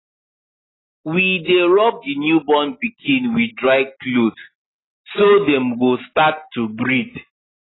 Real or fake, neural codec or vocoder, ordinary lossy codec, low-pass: real; none; AAC, 16 kbps; 7.2 kHz